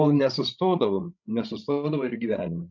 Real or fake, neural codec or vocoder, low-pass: fake; codec, 16 kHz, 16 kbps, FreqCodec, larger model; 7.2 kHz